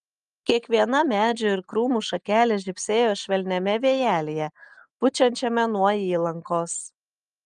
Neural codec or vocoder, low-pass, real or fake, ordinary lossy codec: none; 10.8 kHz; real; Opus, 32 kbps